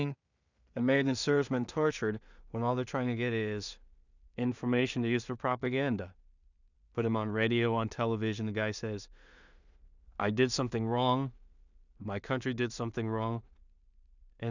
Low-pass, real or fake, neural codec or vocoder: 7.2 kHz; fake; codec, 16 kHz in and 24 kHz out, 0.4 kbps, LongCat-Audio-Codec, two codebook decoder